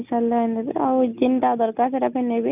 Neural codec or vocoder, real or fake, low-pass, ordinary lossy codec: none; real; 3.6 kHz; none